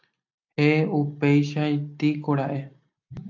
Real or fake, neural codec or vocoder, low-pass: real; none; 7.2 kHz